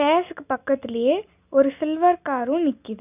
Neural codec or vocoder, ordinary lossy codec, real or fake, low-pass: none; none; real; 3.6 kHz